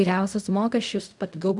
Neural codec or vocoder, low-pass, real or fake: codec, 16 kHz in and 24 kHz out, 0.4 kbps, LongCat-Audio-Codec, fine tuned four codebook decoder; 10.8 kHz; fake